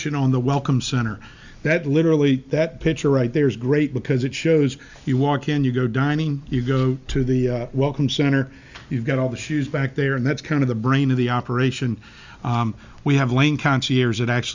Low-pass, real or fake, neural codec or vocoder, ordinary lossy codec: 7.2 kHz; real; none; Opus, 64 kbps